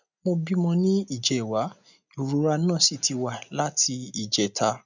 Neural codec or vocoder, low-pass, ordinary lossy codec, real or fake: none; 7.2 kHz; none; real